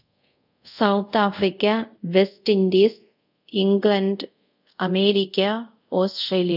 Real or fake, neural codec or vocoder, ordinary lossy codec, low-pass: fake; codec, 24 kHz, 0.5 kbps, DualCodec; none; 5.4 kHz